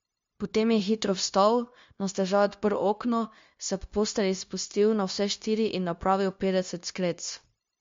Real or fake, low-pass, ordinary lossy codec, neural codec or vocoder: fake; 7.2 kHz; MP3, 48 kbps; codec, 16 kHz, 0.9 kbps, LongCat-Audio-Codec